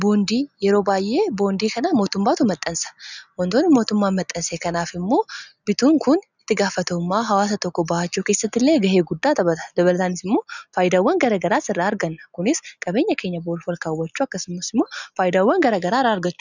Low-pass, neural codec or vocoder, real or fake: 7.2 kHz; none; real